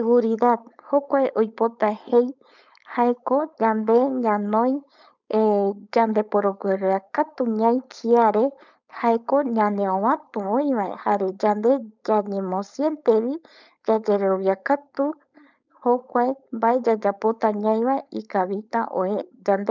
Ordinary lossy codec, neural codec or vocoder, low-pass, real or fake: none; codec, 16 kHz, 4.8 kbps, FACodec; 7.2 kHz; fake